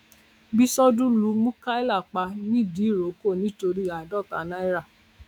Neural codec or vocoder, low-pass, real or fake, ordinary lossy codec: autoencoder, 48 kHz, 128 numbers a frame, DAC-VAE, trained on Japanese speech; 19.8 kHz; fake; none